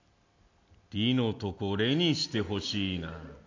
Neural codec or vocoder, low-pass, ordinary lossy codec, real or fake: none; 7.2 kHz; MP3, 64 kbps; real